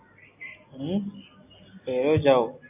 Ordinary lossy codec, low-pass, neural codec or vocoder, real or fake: AAC, 32 kbps; 3.6 kHz; none; real